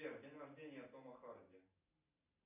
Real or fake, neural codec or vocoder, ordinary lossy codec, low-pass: real; none; MP3, 24 kbps; 3.6 kHz